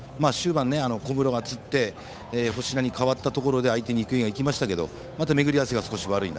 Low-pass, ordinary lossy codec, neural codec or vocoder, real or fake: none; none; codec, 16 kHz, 8 kbps, FunCodec, trained on Chinese and English, 25 frames a second; fake